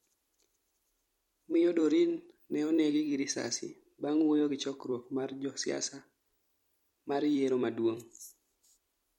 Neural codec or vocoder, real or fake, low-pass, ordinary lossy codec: vocoder, 44.1 kHz, 128 mel bands every 256 samples, BigVGAN v2; fake; 19.8 kHz; MP3, 64 kbps